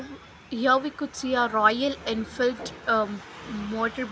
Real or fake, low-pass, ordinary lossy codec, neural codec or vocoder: real; none; none; none